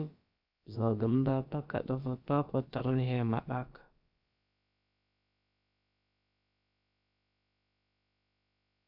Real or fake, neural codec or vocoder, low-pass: fake; codec, 16 kHz, about 1 kbps, DyCAST, with the encoder's durations; 5.4 kHz